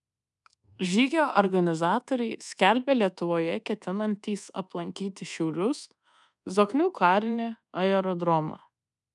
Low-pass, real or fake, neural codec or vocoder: 10.8 kHz; fake; codec, 24 kHz, 1.2 kbps, DualCodec